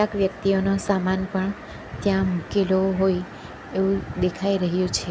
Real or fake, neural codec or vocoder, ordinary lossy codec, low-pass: real; none; none; none